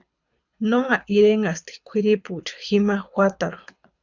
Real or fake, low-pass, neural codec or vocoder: fake; 7.2 kHz; codec, 24 kHz, 6 kbps, HILCodec